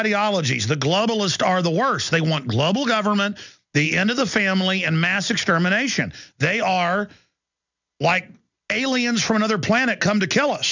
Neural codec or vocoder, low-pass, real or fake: none; 7.2 kHz; real